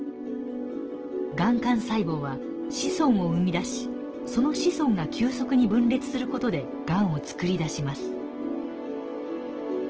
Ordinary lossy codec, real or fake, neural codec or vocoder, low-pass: Opus, 16 kbps; real; none; 7.2 kHz